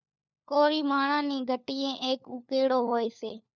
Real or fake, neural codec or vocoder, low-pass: fake; codec, 16 kHz, 4 kbps, FunCodec, trained on LibriTTS, 50 frames a second; 7.2 kHz